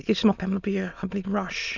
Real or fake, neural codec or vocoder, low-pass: fake; autoencoder, 22.05 kHz, a latent of 192 numbers a frame, VITS, trained on many speakers; 7.2 kHz